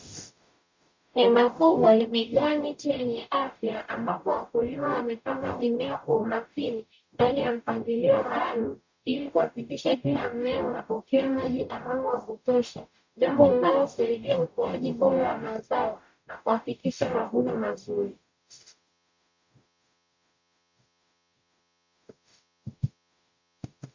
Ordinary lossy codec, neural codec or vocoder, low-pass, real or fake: MP3, 64 kbps; codec, 44.1 kHz, 0.9 kbps, DAC; 7.2 kHz; fake